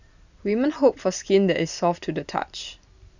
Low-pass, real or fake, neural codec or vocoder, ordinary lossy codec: 7.2 kHz; real; none; none